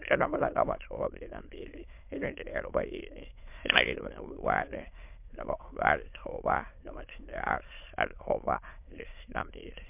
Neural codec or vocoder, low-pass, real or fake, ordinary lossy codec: autoencoder, 22.05 kHz, a latent of 192 numbers a frame, VITS, trained on many speakers; 3.6 kHz; fake; MP3, 32 kbps